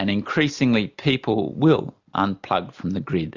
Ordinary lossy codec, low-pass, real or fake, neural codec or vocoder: Opus, 64 kbps; 7.2 kHz; real; none